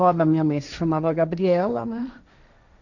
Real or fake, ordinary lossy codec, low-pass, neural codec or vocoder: fake; none; 7.2 kHz; codec, 16 kHz, 1.1 kbps, Voila-Tokenizer